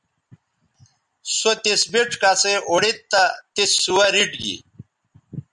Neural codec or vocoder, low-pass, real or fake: none; 10.8 kHz; real